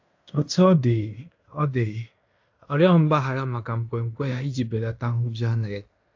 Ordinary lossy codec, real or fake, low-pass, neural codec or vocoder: AAC, 48 kbps; fake; 7.2 kHz; codec, 16 kHz in and 24 kHz out, 0.9 kbps, LongCat-Audio-Codec, fine tuned four codebook decoder